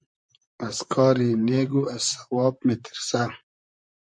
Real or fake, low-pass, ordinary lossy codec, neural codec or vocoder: fake; 9.9 kHz; MP3, 64 kbps; vocoder, 44.1 kHz, 128 mel bands, Pupu-Vocoder